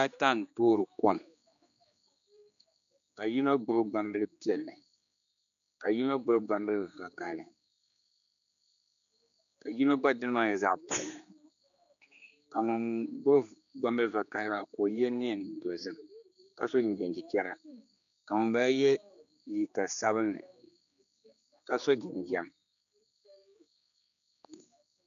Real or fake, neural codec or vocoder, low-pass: fake; codec, 16 kHz, 2 kbps, X-Codec, HuBERT features, trained on general audio; 7.2 kHz